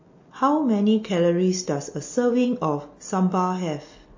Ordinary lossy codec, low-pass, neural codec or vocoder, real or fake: MP3, 32 kbps; 7.2 kHz; none; real